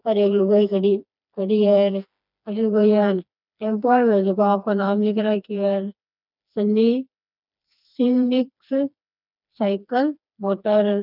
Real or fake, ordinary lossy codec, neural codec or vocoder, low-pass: fake; none; codec, 16 kHz, 2 kbps, FreqCodec, smaller model; 5.4 kHz